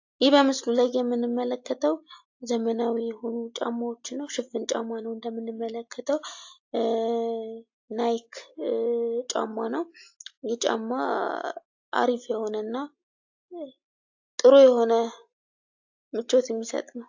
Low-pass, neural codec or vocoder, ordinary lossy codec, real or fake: 7.2 kHz; none; AAC, 48 kbps; real